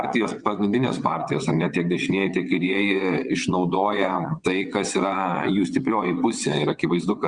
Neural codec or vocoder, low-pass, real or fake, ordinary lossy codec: vocoder, 22.05 kHz, 80 mel bands, WaveNeXt; 9.9 kHz; fake; Opus, 64 kbps